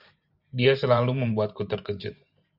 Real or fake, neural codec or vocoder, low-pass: fake; vocoder, 44.1 kHz, 128 mel bands every 512 samples, BigVGAN v2; 5.4 kHz